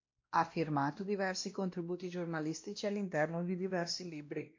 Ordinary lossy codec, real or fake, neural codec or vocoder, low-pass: MP3, 48 kbps; fake; codec, 16 kHz, 1 kbps, X-Codec, WavLM features, trained on Multilingual LibriSpeech; 7.2 kHz